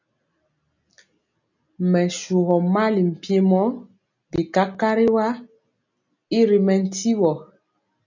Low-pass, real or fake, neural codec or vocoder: 7.2 kHz; real; none